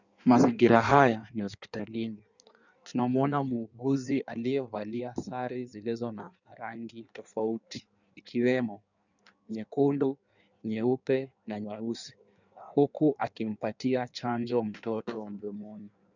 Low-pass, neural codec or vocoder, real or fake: 7.2 kHz; codec, 16 kHz in and 24 kHz out, 1.1 kbps, FireRedTTS-2 codec; fake